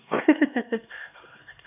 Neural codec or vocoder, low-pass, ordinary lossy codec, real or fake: codec, 16 kHz, 2 kbps, X-Codec, HuBERT features, trained on LibriSpeech; 3.6 kHz; none; fake